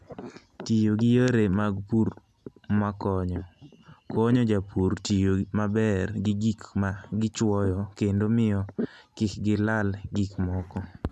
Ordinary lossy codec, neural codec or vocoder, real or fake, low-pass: none; none; real; none